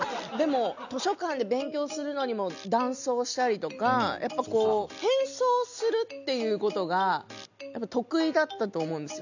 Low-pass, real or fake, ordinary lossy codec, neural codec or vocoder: 7.2 kHz; real; none; none